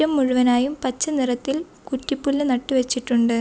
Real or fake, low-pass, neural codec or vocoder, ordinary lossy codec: real; none; none; none